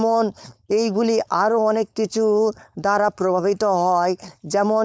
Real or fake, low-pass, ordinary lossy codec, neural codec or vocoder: fake; none; none; codec, 16 kHz, 4.8 kbps, FACodec